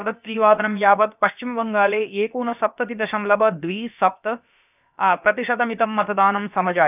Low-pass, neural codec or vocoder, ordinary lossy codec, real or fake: 3.6 kHz; codec, 16 kHz, about 1 kbps, DyCAST, with the encoder's durations; none; fake